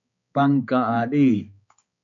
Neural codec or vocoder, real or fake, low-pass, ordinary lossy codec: codec, 16 kHz, 4 kbps, X-Codec, HuBERT features, trained on balanced general audio; fake; 7.2 kHz; AAC, 64 kbps